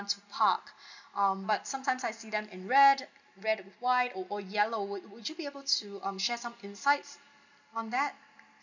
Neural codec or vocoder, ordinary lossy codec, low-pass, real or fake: none; none; 7.2 kHz; real